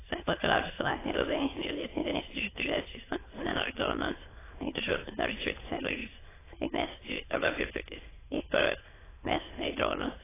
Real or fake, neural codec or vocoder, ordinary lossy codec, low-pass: fake; autoencoder, 22.05 kHz, a latent of 192 numbers a frame, VITS, trained on many speakers; AAC, 16 kbps; 3.6 kHz